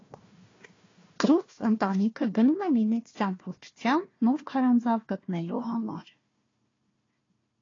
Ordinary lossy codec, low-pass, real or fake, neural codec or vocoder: AAC, 32 kbps; 7.2 kHz; fake; codec, 16 kHz, 1 kbps, FunCodec, trained on Chinese and English, 50 frames a second